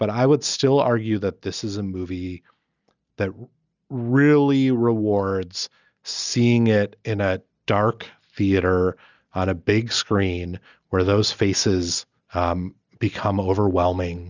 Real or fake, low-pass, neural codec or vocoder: real; 7.2 kHz; none